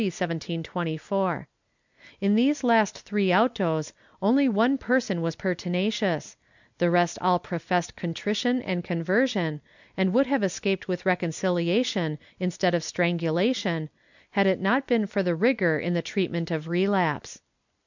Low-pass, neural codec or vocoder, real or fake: 7.2 kHz; none; real